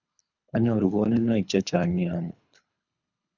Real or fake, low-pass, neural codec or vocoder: fake; 7.2 kHz; codec, 24 kHz, 3 kbps, HILCodec